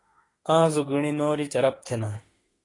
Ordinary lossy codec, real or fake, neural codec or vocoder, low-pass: AAC, 32 kbps; fake; autoencoder, 48 kHz, 32 numbers a frame, DAC-VAE, trained on Japanese speech; 10.8 kHz